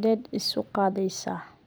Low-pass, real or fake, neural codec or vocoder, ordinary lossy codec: none; fake; vocoder, 44.1 kHz, 128 mel bands every 256 samples, BigVGAN v2; none